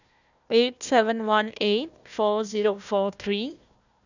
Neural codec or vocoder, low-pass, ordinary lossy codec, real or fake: codec, 16 kHz, 1 kbps, FunCodec, trained on Chinese and English, 50 frames a second; 7.2 kHz; none; fake